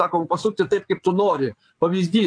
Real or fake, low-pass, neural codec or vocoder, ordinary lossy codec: fake; 9.9 kHz; vocoder, 22.05 kHz, 80 mel bands, Vocos; AAC, 48 kbps